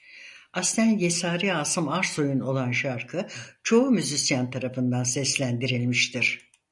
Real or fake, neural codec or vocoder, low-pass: real; none; 10.8 kHz